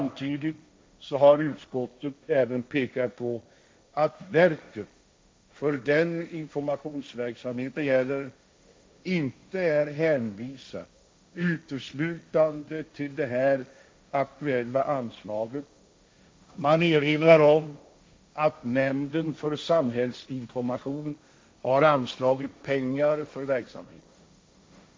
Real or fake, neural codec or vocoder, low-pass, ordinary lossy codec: fake; codec, 16 kHz, 1.1 kbps, Voila-Tokenizer; none; none